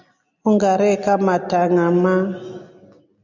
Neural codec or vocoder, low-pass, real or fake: none; 7.2 kHz; real